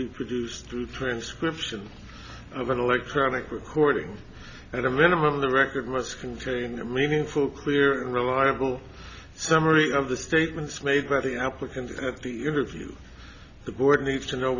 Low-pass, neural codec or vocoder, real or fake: 7.2 kHz; none; real